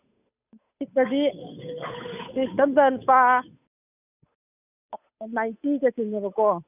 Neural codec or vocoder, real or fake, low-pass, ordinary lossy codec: codec, 16 kHz, 8 kbps, FunCodec, trained on Chinese and English, 25 frames a second; fake; 3.6 kHz; none